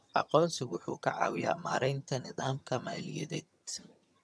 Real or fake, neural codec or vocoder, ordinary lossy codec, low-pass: fake; vocoder, 22.05 kHz, 80 mel bands, HiFi-GAN; none; none